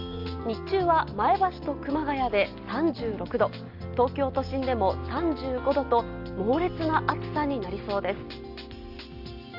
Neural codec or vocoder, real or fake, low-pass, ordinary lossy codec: none; real; 5.4 kHz; Opus, 32 kbps